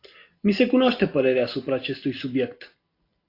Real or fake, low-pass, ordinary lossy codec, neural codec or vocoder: real; 5.4 kHz; AAC, 32 kbps; none